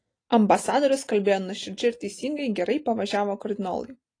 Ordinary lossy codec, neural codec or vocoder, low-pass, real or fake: AAC, 32 kbps; none; 9.9 kHz; real